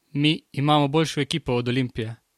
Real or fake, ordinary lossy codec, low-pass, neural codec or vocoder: real; MP3, 64 kbps; 19.8 kHz; none